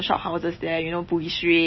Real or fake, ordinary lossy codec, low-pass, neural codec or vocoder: real; MP3, 24 kbps; 7.2 kHz; none